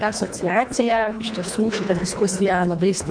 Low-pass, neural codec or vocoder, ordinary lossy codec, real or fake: 9.9 kHz; codec, 24 kHz, 1.5 kbps, HILCodec; MP3, 64 kbps; fake